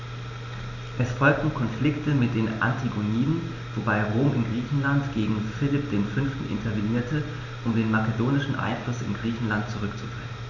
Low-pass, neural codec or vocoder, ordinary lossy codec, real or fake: 7.2 kHz; none; none; real